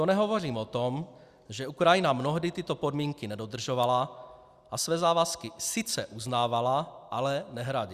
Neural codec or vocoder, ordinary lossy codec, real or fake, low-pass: none; Opus, 64 kbps; real; 14.4 kHz